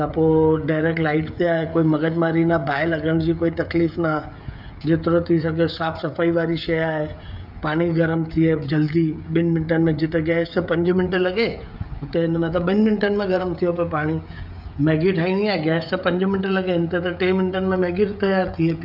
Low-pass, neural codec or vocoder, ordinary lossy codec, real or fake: 5.4 kHz; codec, 16 kHz, 16 kbps, FreqCodec, smaller model; none; fake